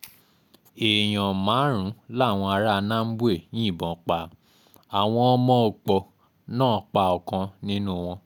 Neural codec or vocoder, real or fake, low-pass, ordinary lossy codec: none; real; 19.8 kHz; none